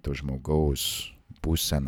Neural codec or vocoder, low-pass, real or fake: none; 19.8 kHz; real